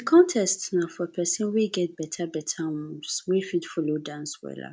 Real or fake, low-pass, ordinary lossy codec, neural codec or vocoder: real; none; none; none